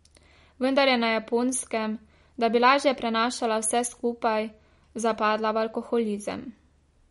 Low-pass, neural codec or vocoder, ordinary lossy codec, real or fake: 19.8 kHz; none; MP3, 48 kbps; real